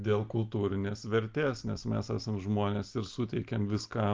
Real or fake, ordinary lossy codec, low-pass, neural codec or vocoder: real; Opus, 24 kbps; 7.2 kHz; none